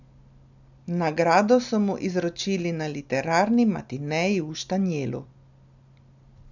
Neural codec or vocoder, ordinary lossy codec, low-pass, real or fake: none; none; 7.2 kHz; real